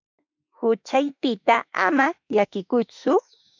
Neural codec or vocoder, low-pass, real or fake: autoencoder, 48 kHz, 32 numbers a frame, DAC-VAE, trained on Japanese speech; 7.2 kHz; fake